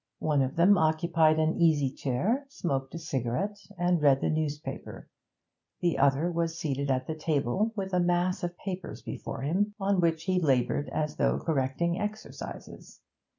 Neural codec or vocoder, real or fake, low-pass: none; real; 7.2 kHz